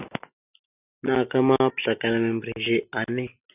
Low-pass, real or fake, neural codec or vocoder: 3.6 kHz; real; none